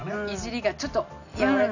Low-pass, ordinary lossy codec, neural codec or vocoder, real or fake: 7.2 kHz; none; none; real